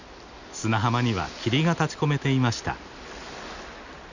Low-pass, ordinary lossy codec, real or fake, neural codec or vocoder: 7.2 kHz; none; real; none